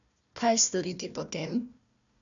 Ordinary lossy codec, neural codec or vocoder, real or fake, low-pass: none; codec, 16 kHz, 1 kbps, FunCodec, trained on Chinese and English, 50 frames a second; fake; 7.2 kHz